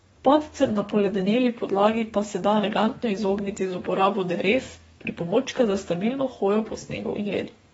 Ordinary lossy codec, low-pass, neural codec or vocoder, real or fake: AAC, 24 kbps; 14.4 kHz; codec, 32 kHz, 1.9 kbps, SNAC; fake